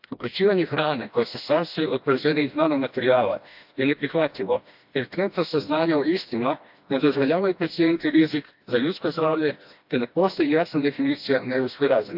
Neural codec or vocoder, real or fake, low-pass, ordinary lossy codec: codec, 16 kHz, 1 kbps, FreqCodec, smaller model; fake; 5.4 kHz; AAC, 48 kbps